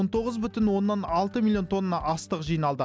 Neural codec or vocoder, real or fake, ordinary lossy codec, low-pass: none; real; none; none